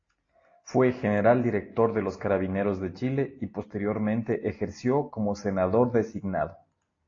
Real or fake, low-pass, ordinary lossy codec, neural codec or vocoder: real; 7.2 kHz; AAC, 32 kbps; none